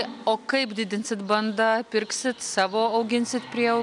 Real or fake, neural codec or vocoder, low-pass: real; none; 10.8 kHz